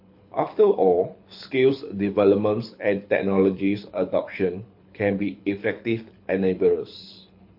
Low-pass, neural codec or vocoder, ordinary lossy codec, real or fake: 5.4 kHz; codec, 24 kHz, 6 kbps, HILCodec; MP3, 32 kbps; fake